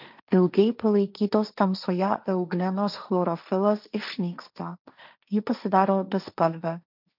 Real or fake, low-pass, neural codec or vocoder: fake; 5.4 kHz; codec, 16 kHz, 1.1 kbps, Voila-Tokenizer